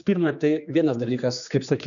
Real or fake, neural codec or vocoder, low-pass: fake; codec, 16 kHz, 2 kbps, X-Codec, HuBERT features, trained on general audio; 7.2 kHz